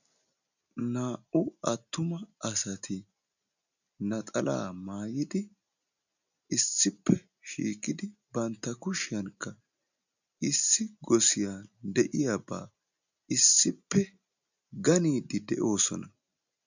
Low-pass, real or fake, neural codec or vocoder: 7.2 kHz; real; none